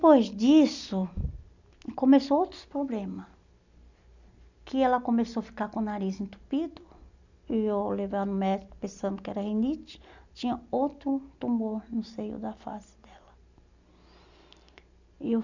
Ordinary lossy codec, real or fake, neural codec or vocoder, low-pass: none; real; none; 7.2 kHz